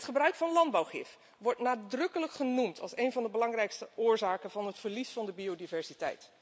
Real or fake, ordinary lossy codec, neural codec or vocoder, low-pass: real; none; none; none